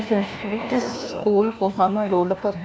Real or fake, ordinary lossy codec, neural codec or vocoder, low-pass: fake; none; codec, 16 kHz, 1 kbps, FunCodec, trained on LibriTTS, 50 frames a second; none